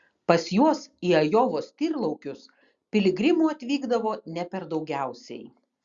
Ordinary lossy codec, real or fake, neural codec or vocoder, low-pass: Opus, 64 kbps; real; none; 7.2 kHz